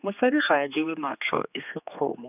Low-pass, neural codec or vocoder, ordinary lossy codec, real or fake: 3.6 kHz; codec, 16 kHz, 2 kbps, X-Codec, HuBERT features, trained on general audio; none; fake